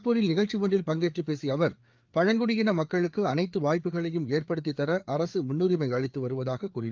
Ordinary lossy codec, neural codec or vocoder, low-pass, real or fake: Opus, 32 kbps; codec, 16 kHz, 4 kbps, FreqCodec, larger model; 7.2 kHz; fake